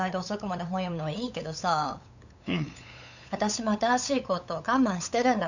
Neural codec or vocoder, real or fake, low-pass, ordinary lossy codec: codec, 16 kHz, 8 kbps, FunCodec, trained on LibriTTS, 25 frames a second; fake; 7.2 kHz; none